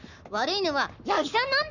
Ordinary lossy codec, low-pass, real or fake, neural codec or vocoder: none; 7.2 kHz; fake; codec, 44.1 kHz, 7.8 kbps, Pupu-Codec